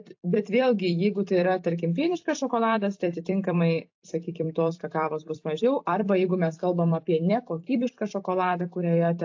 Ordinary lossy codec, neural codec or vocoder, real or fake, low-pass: AAC, 48 kbps; vocoder, 44.1 kHz, 128 mel bands every 256 samples, BigVGAN v2; fake; 7.2 kHz